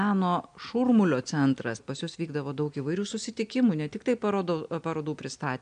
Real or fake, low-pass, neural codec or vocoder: real; 9.9 kHz; none